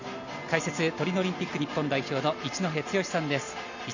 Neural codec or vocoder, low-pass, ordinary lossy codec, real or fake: none; 7.2 kHz; none; real